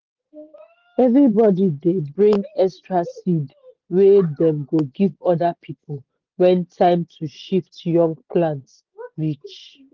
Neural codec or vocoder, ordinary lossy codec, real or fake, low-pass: none; Opus, 16 kbps; real; 7.2 kHz